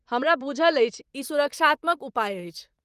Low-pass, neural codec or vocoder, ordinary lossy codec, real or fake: 14.4 kHz; vocoder, 44.1 kHz, 128 mel bands, Pupu-Vocoder; Opus, 24 kbps; fake